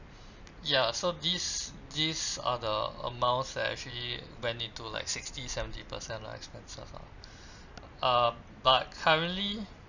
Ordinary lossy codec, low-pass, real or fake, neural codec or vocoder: none; 7.2 kHz; real; none